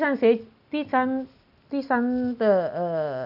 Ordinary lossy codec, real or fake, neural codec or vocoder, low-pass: none; real; none; 5.4 kHz